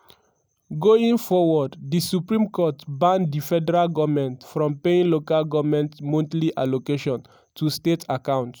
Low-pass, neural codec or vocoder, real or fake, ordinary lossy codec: none; none; real; none